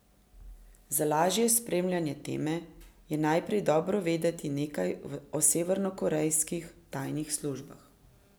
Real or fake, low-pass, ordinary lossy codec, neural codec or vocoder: real; none; none; none